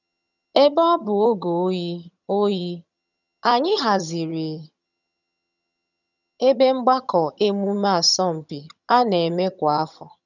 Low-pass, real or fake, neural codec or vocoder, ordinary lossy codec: 7.2 kHz; fake; vocoder, 22.05 kHz, 80 mel bands, HiFi-GAN; none